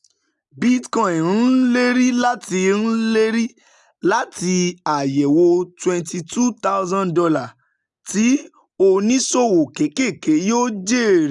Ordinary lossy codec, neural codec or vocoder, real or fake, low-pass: none; none; real; 10.8 kHz